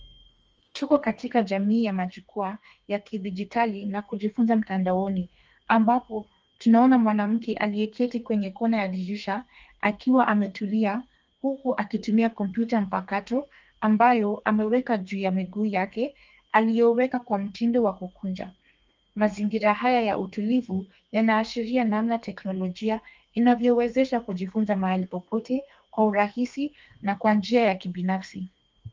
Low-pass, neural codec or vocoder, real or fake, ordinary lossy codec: 7.2 kHz; codec, 32 kHz, 1.9 kbps, SNAC; fake; Opus, 24 kbps